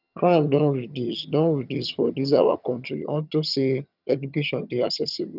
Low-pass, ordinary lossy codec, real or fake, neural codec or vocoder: 5.4 kHz; none; fake; vocoder, 22.05 kHz, 80 mel bands, HiFi-GAN